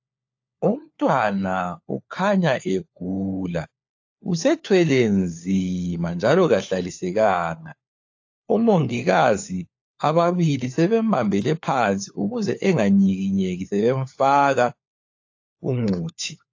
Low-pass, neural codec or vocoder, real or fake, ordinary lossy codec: 7.2 kHz; codec, 16 kHz, 4 kbps, FunCodec, trained on LibriTTS, 50 frames a second; fake; AAC, 48 kbps